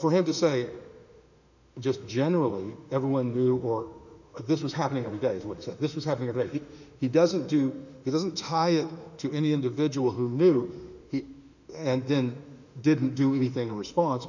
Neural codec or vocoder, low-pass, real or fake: autoencoder, 48 kHz, 32 numbers a frame, DAC-VAE, trained on Japanese speech; 7.2 kHz; fake